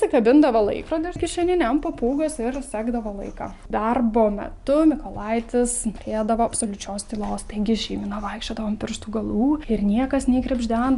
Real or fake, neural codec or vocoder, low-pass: real; none; 10.8 kHz